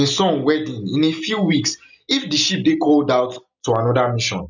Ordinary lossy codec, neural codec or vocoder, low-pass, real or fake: none; none; 7.2 kHz; real